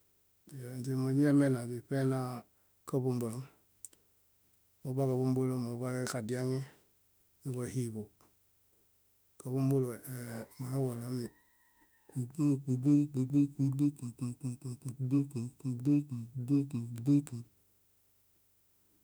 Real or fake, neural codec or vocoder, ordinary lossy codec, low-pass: fake; autoencoder, 48 kHz, 32 numbers a frame, DAC-VAE, trained on Japanese speech; none; none